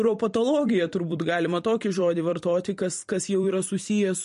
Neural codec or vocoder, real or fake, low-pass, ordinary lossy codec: vocoder, 44.1 kHz, 128 mel bands every 256 samples, BigVGAN v2; fake; 14.4 kHz; MP3, 48 kbps